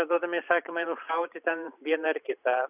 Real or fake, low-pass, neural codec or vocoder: fake; 3.6 kHz; vocoder, 44.1 kHz, 128 mel bands every 512 samples, BigVGAN v2